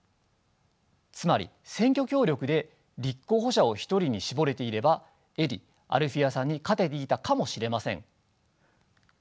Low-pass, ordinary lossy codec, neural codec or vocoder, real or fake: none; none; none; real